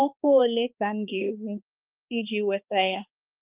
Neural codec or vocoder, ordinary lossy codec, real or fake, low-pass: codec, 16 kHz, 2 kbps, X-Codec, HuBERT features, trained on balanced general audio; Opus, 32 kbps; fake; 3.6 kHz